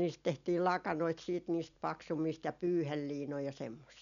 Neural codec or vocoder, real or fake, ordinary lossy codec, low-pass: none; real; none; 7.2 kHz